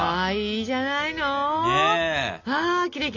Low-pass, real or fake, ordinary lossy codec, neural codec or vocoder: 7.2 kHz; real; Opus, 64 kbps; none